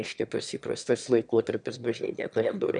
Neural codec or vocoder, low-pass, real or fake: autoencoder, 22.05 kHz, a latent of 192 numbers a frame, VITS, trained on one speaker; 9.9 kHz; fake